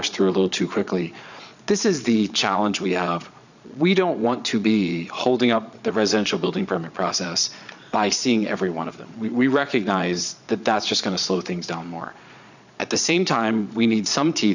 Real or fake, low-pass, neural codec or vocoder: fake; 7.2 kHz; vocoder, 22.05 kHz, 80 mel bands, Vocos